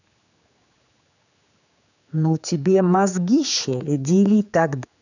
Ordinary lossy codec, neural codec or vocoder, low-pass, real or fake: none; codec, 16 kHz, 4 kbps, X-Codec, HuBERT features, trained on general audio; 7.2 kHz; fake